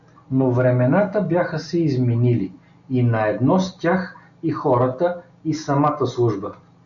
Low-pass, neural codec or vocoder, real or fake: 7.2 kHz; none; real